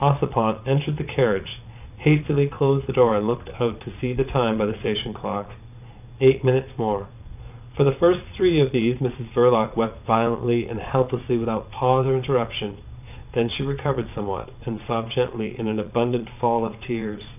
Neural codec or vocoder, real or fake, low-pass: vocoder, 22.05 kHz, 80 mel bands, Vocos; fake; 3.6 kHz